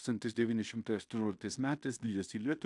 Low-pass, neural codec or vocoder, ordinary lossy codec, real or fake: 10.8 kHz; codec, 16 kHz in and 24 kHz out, 0.9 kbps, LongCat-Audio-Codec, fine tuned four codebook decoder; AAC, 64 kbps; fake